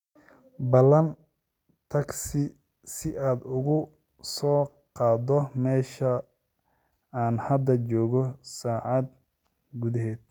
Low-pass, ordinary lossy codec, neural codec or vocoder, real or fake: 19.8 kHz; none; none; real